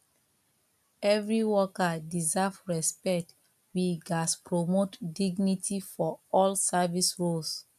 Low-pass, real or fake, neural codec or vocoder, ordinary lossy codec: 14.4 kHz; real; none; none